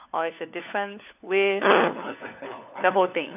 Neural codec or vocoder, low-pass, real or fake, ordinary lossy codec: codec, 16 kHz, 4 kbps, FunCodec, trained on LibriTTS, 50 frames a second; 3.6 kHz; fake; none